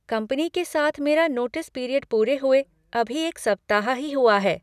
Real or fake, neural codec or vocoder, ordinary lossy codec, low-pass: real; none; none; 14.4 kHz